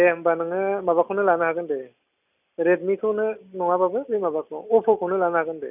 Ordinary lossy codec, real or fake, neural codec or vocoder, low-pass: none; real; none; 3.6 kHz